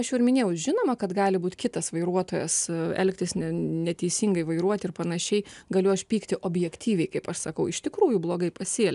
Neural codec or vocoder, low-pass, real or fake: none; 10.8 kHz; real